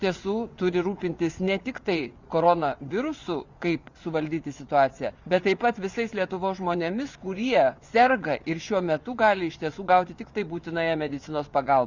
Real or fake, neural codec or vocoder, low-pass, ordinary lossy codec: fake; vocoder, 24 kHz, 100 mel bands, Vocos; 7.2 kHz; Opus, 64 kbps